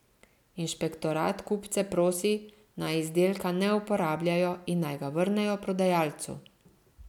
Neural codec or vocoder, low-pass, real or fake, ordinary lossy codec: none; 19.8 kHz; real; none